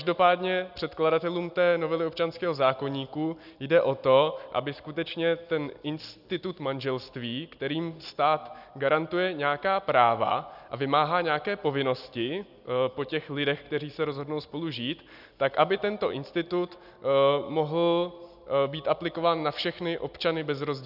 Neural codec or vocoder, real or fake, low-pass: none; real; 5.4 kHz